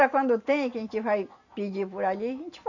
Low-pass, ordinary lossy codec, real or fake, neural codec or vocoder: 7.2 kHz; none; real; none